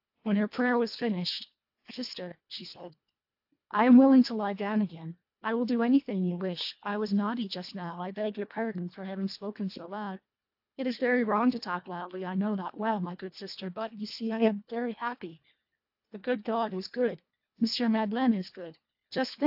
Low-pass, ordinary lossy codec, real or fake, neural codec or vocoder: 5.4 kHz; AAC, 48 kbps; fake; codec, 24 kHz, 1.5 kbps, HILCodec